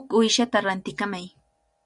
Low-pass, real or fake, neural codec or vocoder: 10.8 kHz; real; none